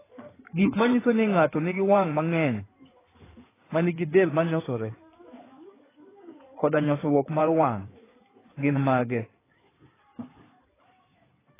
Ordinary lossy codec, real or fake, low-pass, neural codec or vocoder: AAC, 16 kbps; fake; 3.6 kHz; codec, 16 kHz in and 24 kHz out, 2.2 kbps, FireRedTTS-2 codec